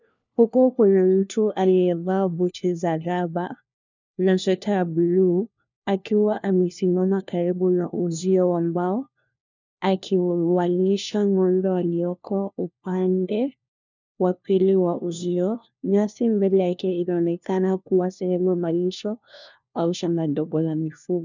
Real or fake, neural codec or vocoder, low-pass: fake; codec, 16 kHz, 1 kbps, FunCodec, trained on LibriTTS, 50 frames a second; 7.2 kHz